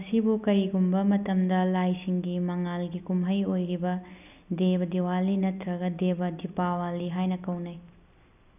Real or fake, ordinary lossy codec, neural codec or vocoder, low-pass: real; none; none; 3.6 kHz